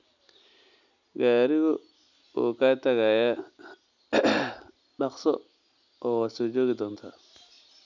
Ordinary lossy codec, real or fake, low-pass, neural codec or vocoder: none; real; 7.2 kHz; none